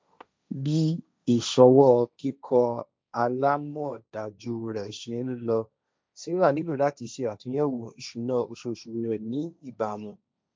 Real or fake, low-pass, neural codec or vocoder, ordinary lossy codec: fake; none; codec, 16 kHz, 1.1 kbps, Voila-Tokenizer; none